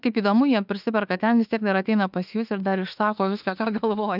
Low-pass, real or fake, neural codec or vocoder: 5.4 kHz; fake; autoencoder, 48 kHz, 32 numbers a frame, DAC-VAE, trained on Japanese speech